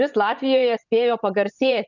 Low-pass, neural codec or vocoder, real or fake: 7.2 kHz; vocoder, 24 kHz, 100 mel bands, Vocos; fake